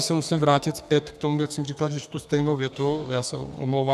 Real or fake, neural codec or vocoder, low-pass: fake; codec, 32 kHz, 1.9 kbps, SNAC; 14.4 kHz